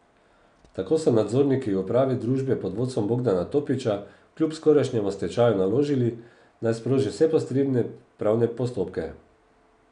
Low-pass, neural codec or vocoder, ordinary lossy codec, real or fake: 9.9 kHz; none; none; real